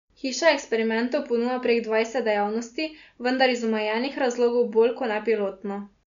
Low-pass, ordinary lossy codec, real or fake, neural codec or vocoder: 7.2 kHz; none; real; none